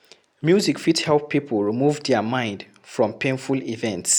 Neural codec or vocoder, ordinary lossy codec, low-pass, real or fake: none; none; none; real